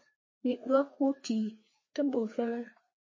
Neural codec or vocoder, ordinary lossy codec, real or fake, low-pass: codec, 16 kHz, 2 kbps, FreqCodec, larger model; MP3, 32 kbps; fake; 7.2 kHz